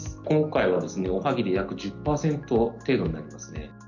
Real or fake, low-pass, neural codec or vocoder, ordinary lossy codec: real; 7.2 kHz; none; none